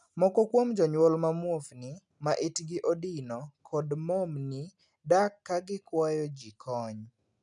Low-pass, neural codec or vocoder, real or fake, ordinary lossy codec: 10.8 kHz; none; real; none